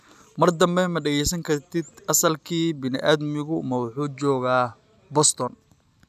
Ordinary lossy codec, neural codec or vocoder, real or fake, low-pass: none; none; real; 14.4 kHz